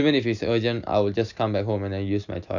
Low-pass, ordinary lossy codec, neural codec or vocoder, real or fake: 7.2 kHz; none; none; real